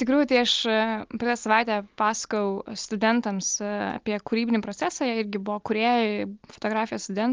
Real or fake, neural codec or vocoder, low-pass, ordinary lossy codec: real; none; 7.2 kHz; Opus, 32 kbps